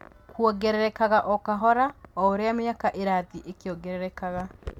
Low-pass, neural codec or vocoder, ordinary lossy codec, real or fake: 19.8 kHz; none; MP3, 96 kbps; real